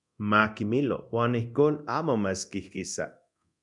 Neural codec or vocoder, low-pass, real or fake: codec, 24 kHz, 0.9 kbps, DualCodec; 10.8 kHz; fake